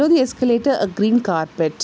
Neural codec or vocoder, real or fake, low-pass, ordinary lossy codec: none; real; none; none